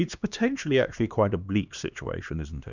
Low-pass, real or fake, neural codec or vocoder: 7.2 kHz; fake; codec, 16 kHz, 2 kbps, X-Codec, HuBERT features, trained on LibriSpeech